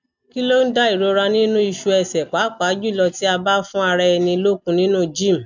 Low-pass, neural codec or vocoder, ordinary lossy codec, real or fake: 7.2 kHz; none; none; real